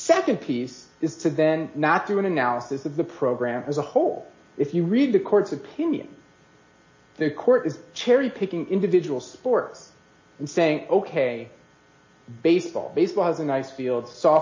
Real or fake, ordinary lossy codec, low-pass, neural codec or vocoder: real; MP3, 32 kbps; 7.2 kHz; none